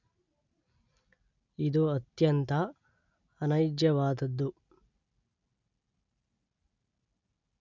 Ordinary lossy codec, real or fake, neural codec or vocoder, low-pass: none; real; none; 7.2 kHz